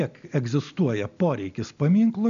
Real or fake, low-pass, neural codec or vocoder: real; 7.2 kHz; none